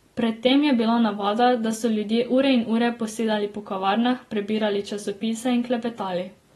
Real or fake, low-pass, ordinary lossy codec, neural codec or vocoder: real; 19.8 kHz; AAC, 32 kbps; none